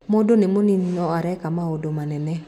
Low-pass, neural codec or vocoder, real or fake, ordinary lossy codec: 19.8 kHz; none; real; none